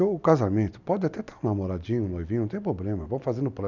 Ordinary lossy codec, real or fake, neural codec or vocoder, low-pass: none; real; none; 7.2 kHz